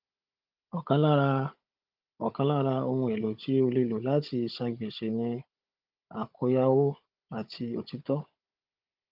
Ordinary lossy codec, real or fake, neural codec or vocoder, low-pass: Opus, 24 kbps; fake; codec, 16 kHz, 16 kbps, FunCodec, trained on Chinese and English, 50 frames a second; 5.4 kHz